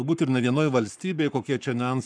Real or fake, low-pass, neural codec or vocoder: fake; 9.9 kHz; codec, 44.1 kHz, 7.8 kbps, Pupu-Codec